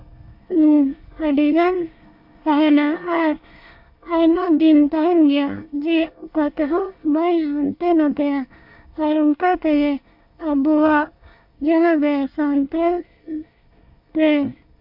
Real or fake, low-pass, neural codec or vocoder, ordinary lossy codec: fake; 5.4 kHz; codec, 24 kHz, 1 kbps, SNAC; MP3, 48 kbps